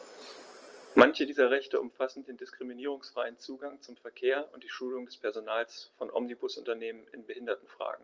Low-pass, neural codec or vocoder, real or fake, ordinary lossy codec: 7.2 kHz; none; real; Opus, 24 kbps